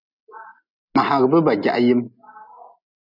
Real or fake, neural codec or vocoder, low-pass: real; none; 5.4 kHz